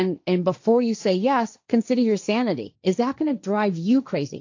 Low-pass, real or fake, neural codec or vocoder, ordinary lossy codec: 7.2 kHz; fake; codec, 16 kHz, 1.1 kbps, Voila-Tokenizer; AAC, 48 kbps